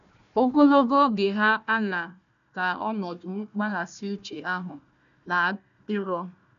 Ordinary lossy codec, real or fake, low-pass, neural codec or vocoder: none; fake; 7.2 kHz; codec, 16 kHz, 1 kbps, FunCodec, trained on Chinese and English, 50 frames a second